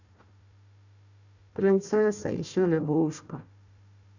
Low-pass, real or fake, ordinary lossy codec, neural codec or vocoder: 7.2 kHz; fake; none; codec, 16 kHz, 1 kbps, FunCodec, trained on Chinese and English, 50 frames a second